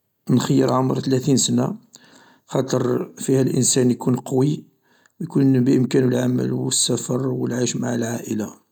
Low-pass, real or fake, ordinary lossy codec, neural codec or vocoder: 19.8 kHz; real; none; none